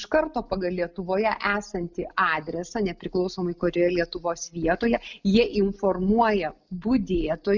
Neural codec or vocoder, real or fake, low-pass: none; real; 7.2 kHz